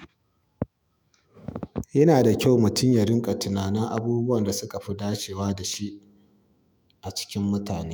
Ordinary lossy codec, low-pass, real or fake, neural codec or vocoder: none; none; fake; autoencoder, 48 kHz, 128 numbers a frame, DAC-VAE, trained on Japanese speech